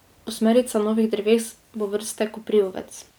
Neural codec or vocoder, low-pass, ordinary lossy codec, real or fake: none; none; none; real